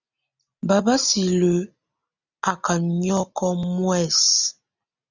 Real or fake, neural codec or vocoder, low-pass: real; none; 7.2 kHz